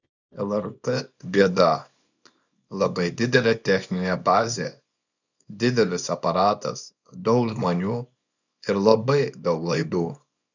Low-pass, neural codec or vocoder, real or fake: 7.2 kHz; codec, 24 kHz, 0.9 kbps, WavTokenizer, small release; fake